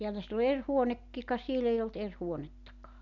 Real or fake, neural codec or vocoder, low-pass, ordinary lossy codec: real; none; 7.2 kHz; none